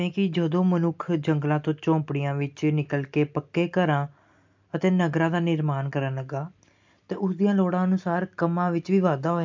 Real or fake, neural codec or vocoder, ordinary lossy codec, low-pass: real; none; MP3, 64 kbps; 7.2 kHz